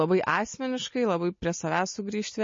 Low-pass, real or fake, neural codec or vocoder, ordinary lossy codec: 7.2 kHz; real; none; MP3, 32 kbps